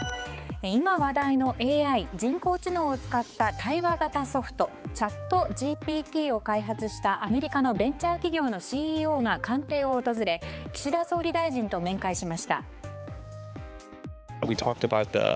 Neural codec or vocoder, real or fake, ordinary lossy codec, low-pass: codec, 16 kHz, 4 kbps, X-Codec, HuBERT features, trained on balanced general audio; fake; none; none